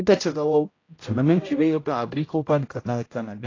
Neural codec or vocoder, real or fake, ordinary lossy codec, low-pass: codec, 16 kHz, 0.5 kbps, X-Codec, HuBERT features, trained on general audio; fake; AAC, 32 kbps; 7.2 kHz